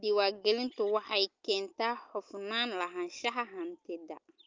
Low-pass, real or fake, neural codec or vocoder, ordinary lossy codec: 7.2 kHz; real; none; Opus, 24 kbps